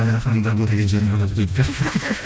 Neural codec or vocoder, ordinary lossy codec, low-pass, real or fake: codec, 16 kHz, 1 kbps, FreqCodec, smaller model; none; none; fake